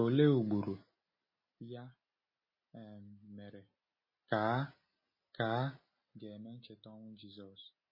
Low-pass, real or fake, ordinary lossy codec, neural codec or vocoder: 5.4 kHz; real; MP3, 24 kbps; none